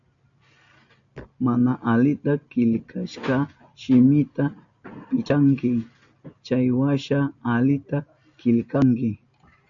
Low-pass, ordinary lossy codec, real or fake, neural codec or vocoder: 7.2 kHz; MP3, 64 kbps; real; none